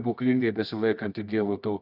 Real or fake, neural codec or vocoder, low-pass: fake; codec, 24 kHz, 0.9 kbps, WavTokenizer, medium music audio release; 5.4 kHz